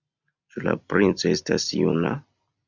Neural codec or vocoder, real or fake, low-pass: none; real; 7.2 kHz